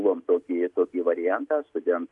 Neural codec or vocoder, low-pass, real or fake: none; 10.8 kHz; real